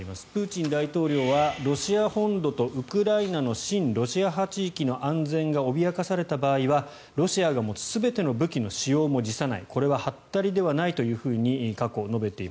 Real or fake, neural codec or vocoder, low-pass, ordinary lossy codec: real; none; none; none